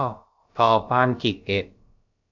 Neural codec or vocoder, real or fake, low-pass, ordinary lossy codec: codec, 16 kHz, about 1 kbps, DyCAST, with the encoder's durations; fake; 7.2 kHz; AAC, 48 kbps